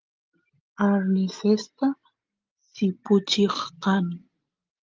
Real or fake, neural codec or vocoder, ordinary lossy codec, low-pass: real; none; Opus, 24 kbps; 7.2 kHz